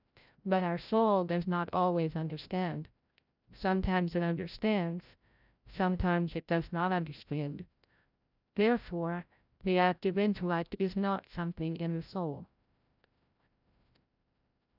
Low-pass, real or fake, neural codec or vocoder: 5.4 kHz; fake; codec, 16 kHz, 0.5 kbps, FreqCodec, larger model